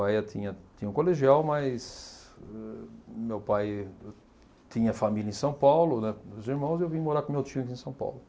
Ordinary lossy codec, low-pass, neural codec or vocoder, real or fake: none; none; none; real